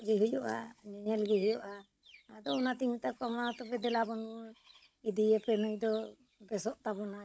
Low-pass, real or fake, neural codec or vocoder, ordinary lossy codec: none; fake; codec, 16 kHz, 16 kbps, FunCodec, trained on Chinese and English, 50 frames a second; none